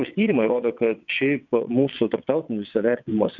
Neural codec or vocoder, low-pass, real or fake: vocoder, 22.05 kHz, 80 mel bands, WaveNeXt; 7.2 kHz; fake